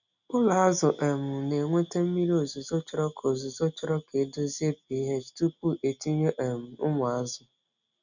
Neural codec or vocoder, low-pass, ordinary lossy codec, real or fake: none; 7.2 kHz; none; real